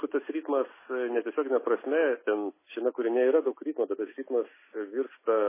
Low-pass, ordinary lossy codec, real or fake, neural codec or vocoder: 3.6 kHz; MP3, 16 kbps; real; none